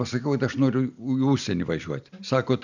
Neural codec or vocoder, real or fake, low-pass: none; real; 7.2 kHz